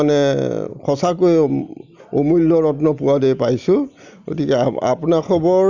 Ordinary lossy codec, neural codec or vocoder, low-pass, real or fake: Opus, 64 kbps; none; 7.2 kHz; real